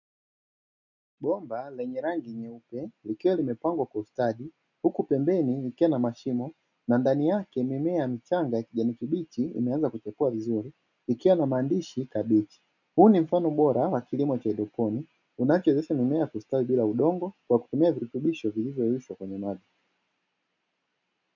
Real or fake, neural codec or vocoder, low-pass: real; none; 7.2 kHz